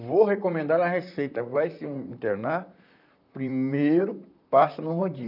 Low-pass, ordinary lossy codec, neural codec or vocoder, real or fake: 5.4 kHz; none; vocoder, 44.1 kHz, 128 mel bands, Pupu-Vocoder; fake